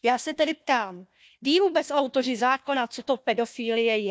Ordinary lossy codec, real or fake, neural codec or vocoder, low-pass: none; fake; codec, 16 kHz, 1 kbps, FunCodec, trained on Chinese and English, 50 frames a second; none